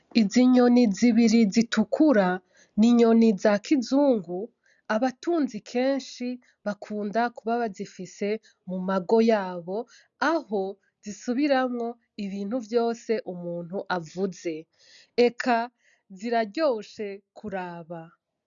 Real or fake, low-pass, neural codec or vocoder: real; 7.2 kHz; none